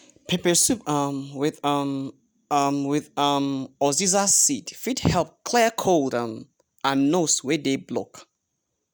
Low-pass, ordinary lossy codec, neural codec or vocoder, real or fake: none; none; none; real